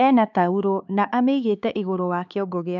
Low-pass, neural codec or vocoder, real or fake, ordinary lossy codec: 7.2 kHz; codec, 16 kHz, 4 kbps, X-Codec, HuBERT features, trained on LibriSpeech; fake; none